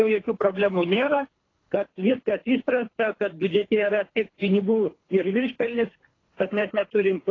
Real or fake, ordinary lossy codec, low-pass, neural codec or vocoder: fake; AAC, 32 kbps; 7.2 kHz; codec, 24 kHz, 3 kbps, HILCodec